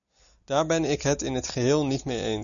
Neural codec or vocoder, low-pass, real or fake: none; 7.2 kHz; real